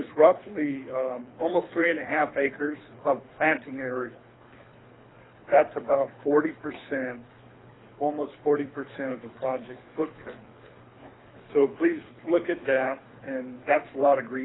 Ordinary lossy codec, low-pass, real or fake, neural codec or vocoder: AAC, 16 kbps; 7.2 kHz; fake; codec, 24 kHz, 3 kbps, HILCodec